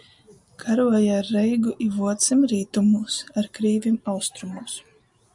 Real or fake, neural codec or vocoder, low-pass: real; none; 10.8 kHz